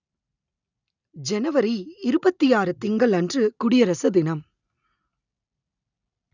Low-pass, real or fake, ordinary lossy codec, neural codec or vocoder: 7.2 kHz; real; none; none